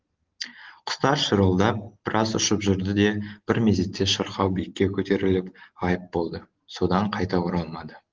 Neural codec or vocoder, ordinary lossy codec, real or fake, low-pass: none; Opus, 16 kbps; real; 7.2 kHz